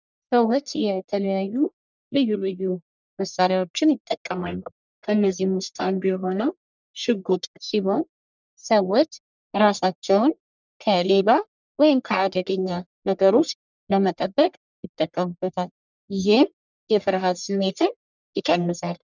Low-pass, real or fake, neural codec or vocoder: 7.2 kHz; fake; codec, 44.1 kHz, 1.7 kbps, Pupu-Codec